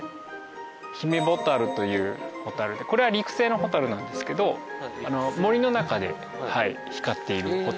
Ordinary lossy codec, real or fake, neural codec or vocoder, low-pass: none; real; none; none